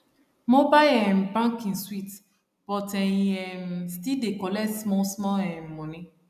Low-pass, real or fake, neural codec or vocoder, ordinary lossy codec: 14.4 kHz; real; none; none